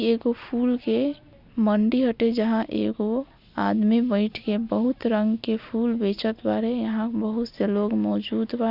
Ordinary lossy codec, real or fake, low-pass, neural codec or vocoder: none; real; 5.4 kHz; none